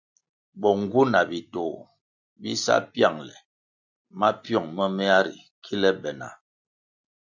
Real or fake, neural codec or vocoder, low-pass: real; none; 7.2 kHz